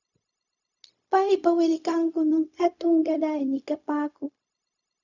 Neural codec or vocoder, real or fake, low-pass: codec, 16 kHz, 0.4 kbps, LongCat-Audio-Codec; fake; 7.2 kHz